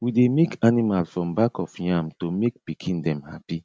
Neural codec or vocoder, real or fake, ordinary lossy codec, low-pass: none; real; none; none